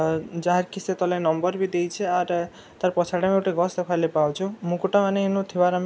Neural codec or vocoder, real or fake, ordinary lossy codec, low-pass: none; real; none; none